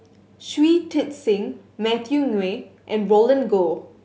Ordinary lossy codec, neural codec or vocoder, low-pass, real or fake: none; none; none; real